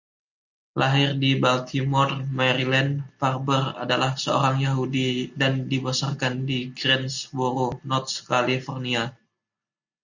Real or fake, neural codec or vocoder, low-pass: real; none; 7.2 kHz